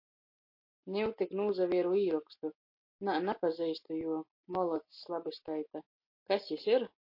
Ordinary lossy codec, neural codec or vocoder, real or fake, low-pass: MP3, 32 kbps; none; real; 5.4 kHz